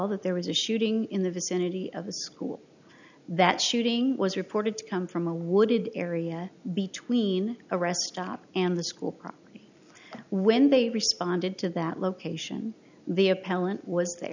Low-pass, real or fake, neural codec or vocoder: 7.2 kHz; real; none